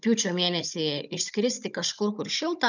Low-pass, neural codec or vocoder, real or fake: 7.2 kHz; codec, 16 kHz, 16 kbps, FunCodec, trained on Chinese and English, 50 frames a second; fake